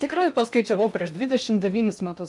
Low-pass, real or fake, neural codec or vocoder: 10.8 kHz; fake; codec, 16 kHz in and 24 kHz out, 0.8 kbps, FocalCodec, streaming, 65536 codes